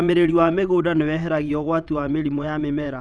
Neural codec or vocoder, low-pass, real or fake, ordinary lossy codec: vocoder, 22.05 kHz, 80 mel bands, WaveNeXt; none; fake; none